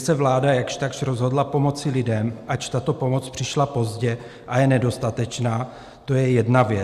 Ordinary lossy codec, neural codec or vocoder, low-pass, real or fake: Opus, 64 kbps; none; 14.4 kHz; real